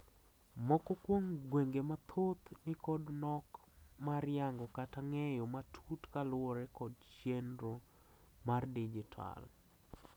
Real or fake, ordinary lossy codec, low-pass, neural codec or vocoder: real; none; none; none